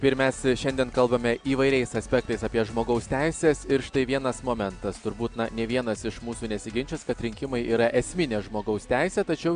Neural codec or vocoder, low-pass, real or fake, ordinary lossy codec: none; 9.9 kHz; real; MP3, 96 kbps